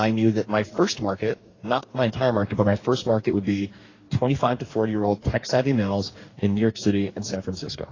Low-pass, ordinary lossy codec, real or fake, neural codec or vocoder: 7.2 kHz; AAC, 32 kbps; fake; codec, 44.1 kHz, 2.6 kbps, DAC